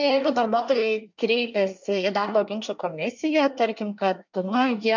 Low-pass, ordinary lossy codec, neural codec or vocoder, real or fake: 7.2 kHz; MP3, 64 kbps; codec, 24 kHz, 1 kbps, SNAC; fake